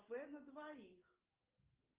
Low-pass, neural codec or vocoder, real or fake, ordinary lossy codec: 3.6 kHz; none; real; Opus, 24 kbps